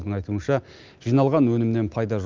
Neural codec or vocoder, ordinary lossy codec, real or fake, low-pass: none; Opus, 24 kbps; real; 7.2 kHz